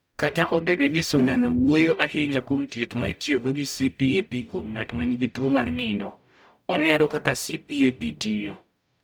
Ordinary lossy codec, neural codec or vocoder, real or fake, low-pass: none; codec, 44.1 kHz, 0.9 kbps, DAC; fake; none